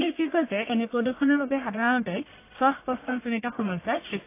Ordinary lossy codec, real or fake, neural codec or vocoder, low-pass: AAC, 24 kbps; fake; codec, 44.1 kHz, 1.7 kbps, Pupu-Codec; 3.6 kHz